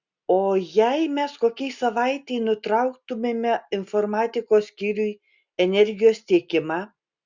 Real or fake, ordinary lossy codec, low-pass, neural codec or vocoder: real; Opus, 64 kbps; 7.2 kHz; none